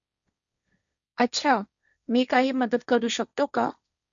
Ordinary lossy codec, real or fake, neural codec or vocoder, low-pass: none; fake; codec, 16 kHz, 1.1 kbps, Voila-Tokenizer; 7.2 kHz